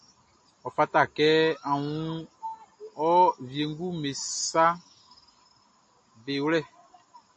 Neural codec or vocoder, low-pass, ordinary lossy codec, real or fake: none; 9.9 kHz; MP3, 32 kbps; real